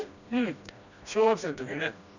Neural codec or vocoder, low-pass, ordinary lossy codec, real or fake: codec, 16 kHz, 1 kbps, FreqCodec, smaller model; 7.2 kHz; none; fake